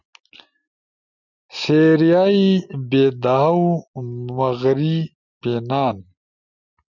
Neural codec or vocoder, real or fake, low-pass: none; real; 7.2 kHz